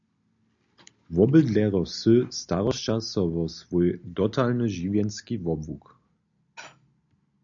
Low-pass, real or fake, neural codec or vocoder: 7.2 kHz; real; none